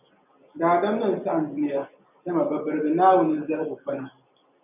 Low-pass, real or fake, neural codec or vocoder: 3.6 kHz; real; none